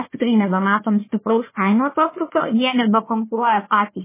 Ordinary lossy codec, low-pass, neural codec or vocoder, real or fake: MP3, 16 kbps; 3.6 kHz; codec, 16 kHz, 1 kbps, FunCodec, trained on Chinese and English, 50 frames a second; fake